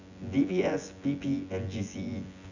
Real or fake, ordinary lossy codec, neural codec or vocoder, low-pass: fake; none; vocoder, 24 kHz, 100 mel bands, Vocos; 7.2 kHz